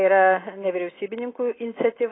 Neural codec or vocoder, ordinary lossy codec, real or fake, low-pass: none; AAC, 16 kbps; real; 7.2 kHz